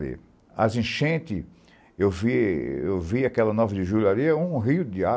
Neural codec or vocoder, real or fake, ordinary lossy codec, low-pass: none; real; none; none